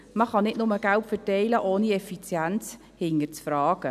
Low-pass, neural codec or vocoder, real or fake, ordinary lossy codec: 14.4 kHz; none; real; none